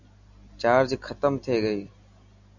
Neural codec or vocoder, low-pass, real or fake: none; 7.2 kHz; real